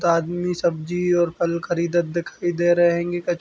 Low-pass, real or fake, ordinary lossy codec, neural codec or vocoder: none; real; none; none